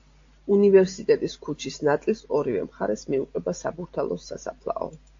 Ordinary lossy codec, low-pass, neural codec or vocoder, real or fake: AAC, 48 kbps; 7.2 kHz; none; real